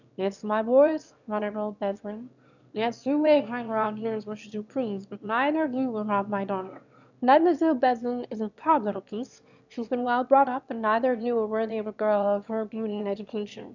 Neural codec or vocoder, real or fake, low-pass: autoencoder, 22.05 kHz, a latent of 192 numbers a frame, VITS, trained on one speaker; fake; 7.2 kHz